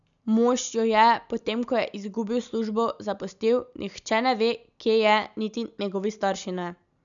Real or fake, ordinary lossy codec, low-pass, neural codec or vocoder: real; MP3, 96 kbps; 7.2 kHz; none